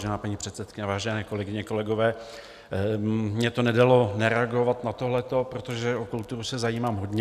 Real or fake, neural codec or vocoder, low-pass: real; none; 14.4 kHz